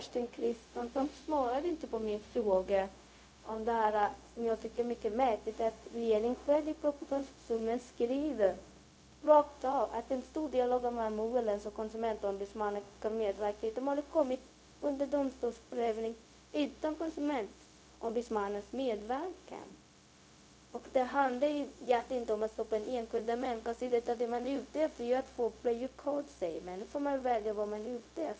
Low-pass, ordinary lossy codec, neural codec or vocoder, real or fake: none; none; codec, 16 kHz, 0.4 kbps, LongCat-Audio-Codec; fake